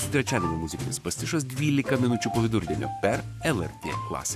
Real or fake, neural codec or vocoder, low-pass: fake; codec, 44.1 kHz, 7.8 kbps, Pupu-Codec; 14.4 kHz